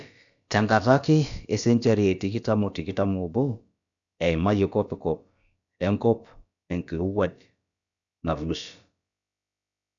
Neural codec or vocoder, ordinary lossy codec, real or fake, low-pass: codec, 16 kHz, about 1 kbps, DyCAST, with the encoder's durations; none; fake; 7.2 kHz